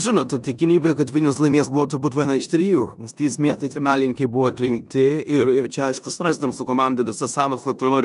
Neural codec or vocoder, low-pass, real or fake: codec, 16 kHz in and 24 kHz out, 0.9 kbps, LongCat-Audio-Codec, four codebook decoder; 10.8 kHz; fake